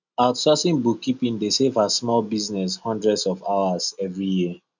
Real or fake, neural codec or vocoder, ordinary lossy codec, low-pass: real; none; none; 7.2 kHz